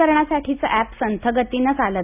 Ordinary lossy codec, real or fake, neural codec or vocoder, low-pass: none; real; none; 3.6 kHz